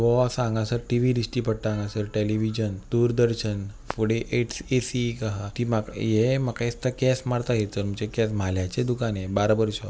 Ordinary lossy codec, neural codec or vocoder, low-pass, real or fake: none; none; none; real